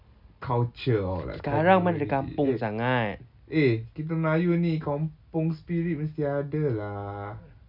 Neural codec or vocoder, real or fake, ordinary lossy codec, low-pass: none; real; none; 5.4 kHz